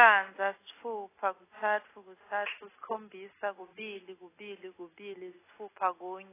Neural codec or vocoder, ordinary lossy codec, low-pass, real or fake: none; AAC, 16 kbps; 3.6 kHz; real